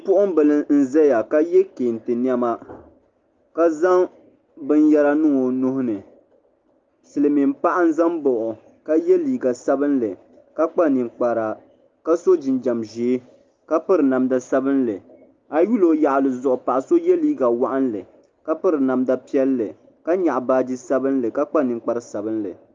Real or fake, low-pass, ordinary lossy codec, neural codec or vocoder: real; 7.2 kHz; Opus, 32 kbps; none